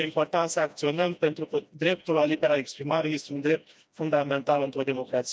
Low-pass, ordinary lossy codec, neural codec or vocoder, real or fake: none; none; codec, 16 kHz, 1 kbps, FreqCodec, smaller model; fake